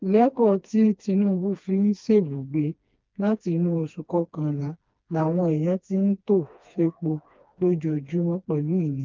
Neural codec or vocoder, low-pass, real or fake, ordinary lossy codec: codec, 16 kHz, 2 kbps, FreqCodec, smaller model; 7.2 kHz; fake; Opus, 24 kbps